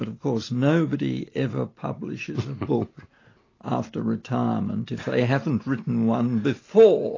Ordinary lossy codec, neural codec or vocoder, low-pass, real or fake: AAC, 32 kbps; none; 7.2 kHz; real